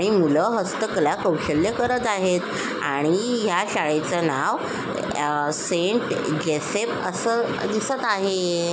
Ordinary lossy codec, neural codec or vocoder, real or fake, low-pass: none; none; real; none